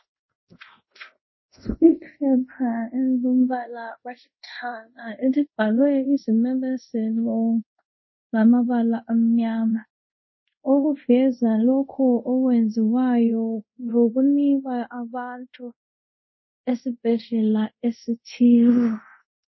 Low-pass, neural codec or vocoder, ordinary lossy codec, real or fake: 7.2 kHz; codec, 24 kHz, 0.5 kbps, DualCodec; MP3, 24 kbps; fake